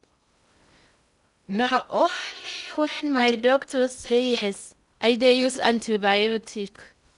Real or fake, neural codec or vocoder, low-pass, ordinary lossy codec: fake; codec, 16 kHz in and 24 kHz out, 0.6 kbps, FocalCodec, streaming, 2048 codes; 10.8 kHz; none